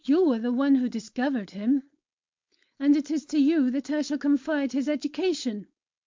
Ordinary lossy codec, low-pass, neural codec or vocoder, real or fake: MP3, 64 kbps; 7.2 kHz; codec, 16 kHz, 4.8 kbps, FACodec; fake